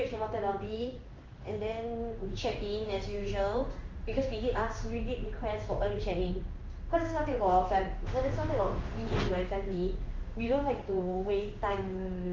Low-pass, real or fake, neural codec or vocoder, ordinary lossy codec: 7.2 kHz; fake; codec, 16 kHz in and 24 kHz out, 1 kbps, XY-Tokenizer; Opus, 32 kbps